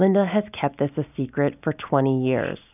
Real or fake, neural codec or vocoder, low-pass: real; none; 3.6 kHz